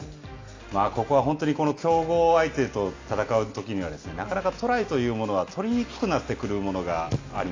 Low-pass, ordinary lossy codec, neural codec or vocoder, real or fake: 7.2 kHz; AAC, 32 kbps; none; real